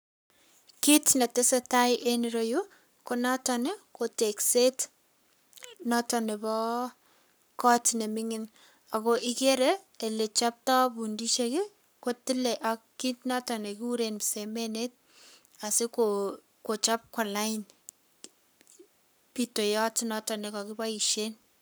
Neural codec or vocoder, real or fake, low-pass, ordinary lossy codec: codec, 44.1 kHz, 7.8 kbps, Pupu-Codec; fake; none; none